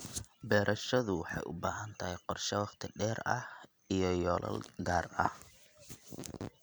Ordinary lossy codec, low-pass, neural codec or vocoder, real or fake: none; none; vocoder, 44.1 kHz, 128 mel bands every 256 samples, BigVGAN v2; fake